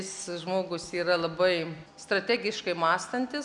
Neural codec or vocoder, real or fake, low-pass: none; real; 10.8 kHz